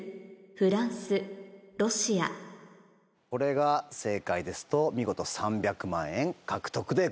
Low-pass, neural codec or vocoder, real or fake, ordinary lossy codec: none; none; real; none